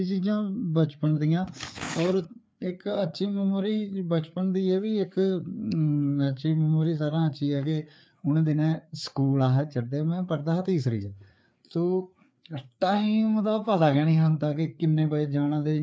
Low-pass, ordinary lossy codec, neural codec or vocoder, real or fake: none; none; codec, 16 kHz, 4 kbps, FreqCodec, larger model; fake